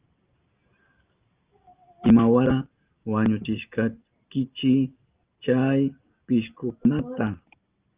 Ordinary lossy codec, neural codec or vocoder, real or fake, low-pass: Opus, 32 kbps; none; real; 3.6 kHz